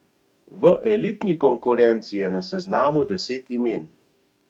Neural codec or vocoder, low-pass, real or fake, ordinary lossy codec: codec, 44.1 kHz, 2.6 kbps, DAC; 19.8 kHz; fake; none